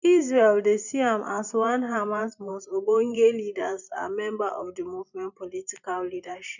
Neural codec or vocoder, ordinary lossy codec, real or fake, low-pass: vocoder, 44.1 kHz, 128 mel bands every 512 samples, BigVGAN v2; none; fake; 7.2 kHz